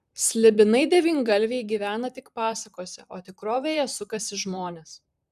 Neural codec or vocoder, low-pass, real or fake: vocoder, 44.1 kHz, 128 mel bands, Pupu-Vocoder; 14.4 kHz; fake